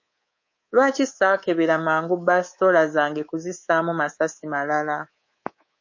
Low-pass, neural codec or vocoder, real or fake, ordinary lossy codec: 7.2 kHz; codec, 24 kHz, 3.1 kbps, DualCodec; fake; MP3, 32 kbps